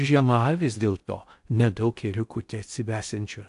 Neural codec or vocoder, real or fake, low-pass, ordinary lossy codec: codec, 16 kHz in and 24 kHz out, 0.6 kbps, FocalCodec, streaming, 4096 codes; fake; 10.8 kHz; AAC, 64 kbps